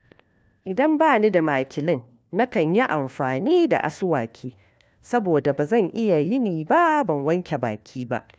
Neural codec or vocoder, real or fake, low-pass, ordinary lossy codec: codec, 16 kHz, 1 kbps, FunCodec, trained on LibriTTS, 50 frames a second; fake; none; none